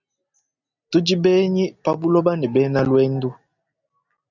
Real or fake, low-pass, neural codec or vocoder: real; 7.2 kHz; none